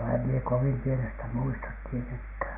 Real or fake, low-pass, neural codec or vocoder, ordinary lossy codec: real; 3.6 kHz; none; AAC, 24 kbps